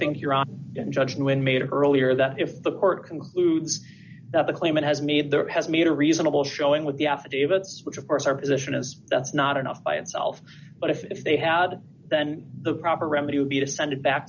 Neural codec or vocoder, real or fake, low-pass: none; real; 7.2 kHz